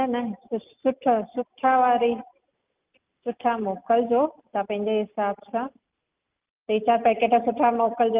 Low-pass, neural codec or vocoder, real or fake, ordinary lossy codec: 3.6 kHz; none; real; Opus, 32 kbps